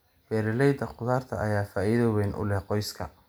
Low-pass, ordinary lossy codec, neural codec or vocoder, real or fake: none; none; none; real